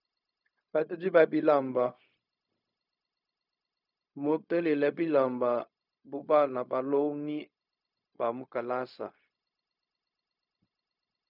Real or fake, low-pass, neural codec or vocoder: fake; 5.4 kHz; codec, 16 kHz, 0.4 kbps, LongCat-Audio-Codec